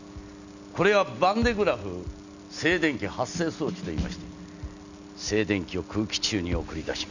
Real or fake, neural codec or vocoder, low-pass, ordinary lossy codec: real; none; 7.2 kHz; none